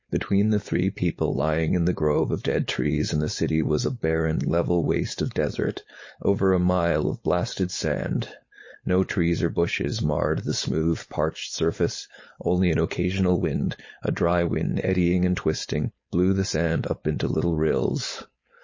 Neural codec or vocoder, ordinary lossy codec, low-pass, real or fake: codec, 16 kHz, 4.8 kbps, FACodec; MP3, 32 kbps; 7.2 kHz; fake